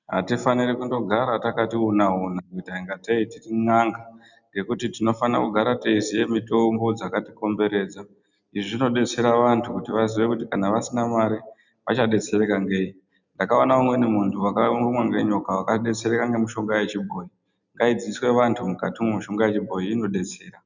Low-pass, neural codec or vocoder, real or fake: 7.2 kHz; none; real